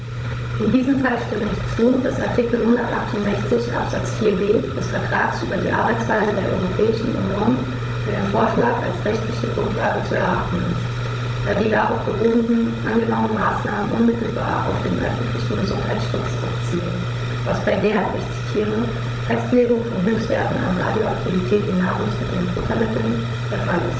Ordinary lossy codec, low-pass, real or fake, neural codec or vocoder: none; none; fake; codec, 16 kHz, 16 kbps, FunCodec, trained on Chinese and English, 50 frames a second